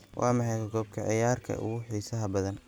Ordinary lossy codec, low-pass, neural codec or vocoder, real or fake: none; none; none; real